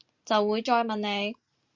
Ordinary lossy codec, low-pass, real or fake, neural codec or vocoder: Opus, 64 kbps; 7.2 kHz; real; none